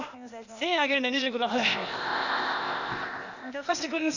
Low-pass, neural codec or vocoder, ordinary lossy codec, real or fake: 7.2 kHz; codec, 16 kHz, 0.8 kbps, ZipCodec; none; fake